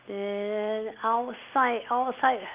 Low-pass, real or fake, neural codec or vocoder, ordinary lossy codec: 3.6 kHz; real; none; Opus, 24 kbps